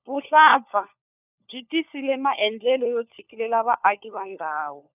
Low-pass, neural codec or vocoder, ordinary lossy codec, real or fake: 3.6 kHz; codec, 16 kHz, 4 kbps, FunCodec, trained on LibriTTS, 50 frames a second; none; fake